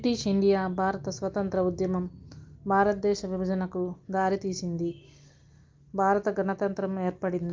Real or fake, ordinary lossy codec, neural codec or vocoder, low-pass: real; Opus, 32 kbps; none; 7.2 kHz